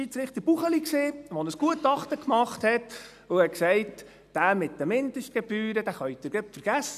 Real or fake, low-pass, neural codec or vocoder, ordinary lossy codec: real; 14.4 kHz; none; none